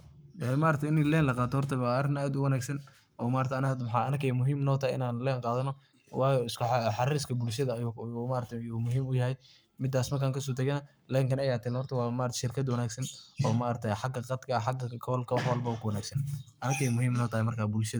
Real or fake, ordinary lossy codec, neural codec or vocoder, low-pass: fake; none; codec, 44.1 kHz, 7.8 kbps, Pupu-Codec; none